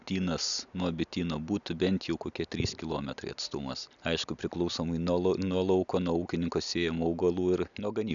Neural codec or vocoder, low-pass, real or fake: none; 7.2 kHz; real